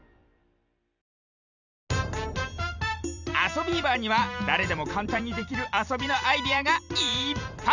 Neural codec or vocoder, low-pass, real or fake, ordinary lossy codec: vocoder, 44.1 kHz, 128 mel bands every 256 samples, BigVGAN v2; 7.2 kHz; fake; none